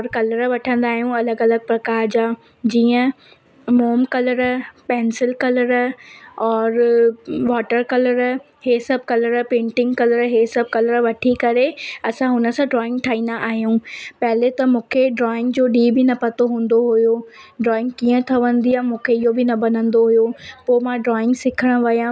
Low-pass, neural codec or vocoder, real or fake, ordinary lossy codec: none; none; real; none